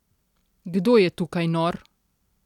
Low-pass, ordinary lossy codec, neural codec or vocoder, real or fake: 19.8 kHz; none; none; real